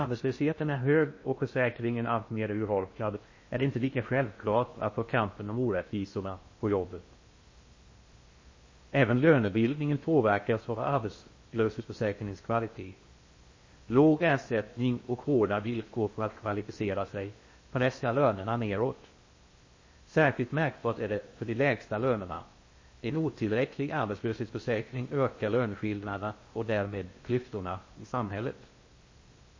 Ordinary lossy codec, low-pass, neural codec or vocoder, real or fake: MP3, 32 kbps; 7.2 kHz; codec, 16 kHz in and 24 kHz out, 0.6 kbps, FocalCodec, streaming, 2048 codes; fake